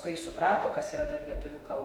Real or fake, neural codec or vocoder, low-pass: fake; autoencoder, 48 kHz, 32 numbers a frame, DAC-VAE, trained on Japanese speech; 19.8 kHz